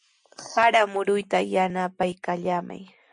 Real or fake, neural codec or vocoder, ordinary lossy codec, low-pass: real; none; MP3, 64 kbps; 9.9 kHz